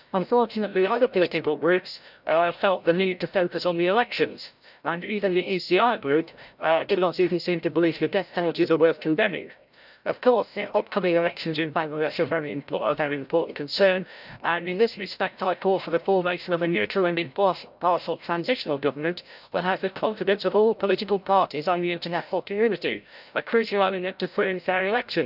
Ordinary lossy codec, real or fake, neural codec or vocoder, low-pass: none; fake; codec, 16 kHz, 0.5 kbps, FreqCodec, larger model; 5.4 kHz